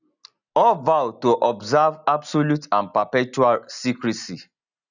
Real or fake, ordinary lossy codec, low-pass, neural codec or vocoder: real; none; 7.2 kHz; none